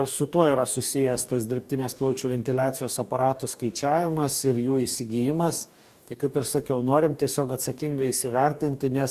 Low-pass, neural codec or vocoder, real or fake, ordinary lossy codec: 14.4 kHz; codec, 44.1 kHz, 2.6 kbps, DAC; fake; Opus, 64 kbps